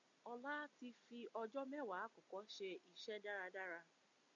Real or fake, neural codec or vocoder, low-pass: real; none; 7.2 kHz